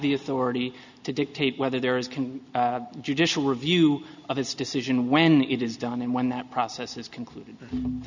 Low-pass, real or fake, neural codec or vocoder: 7.2 kHz; real; none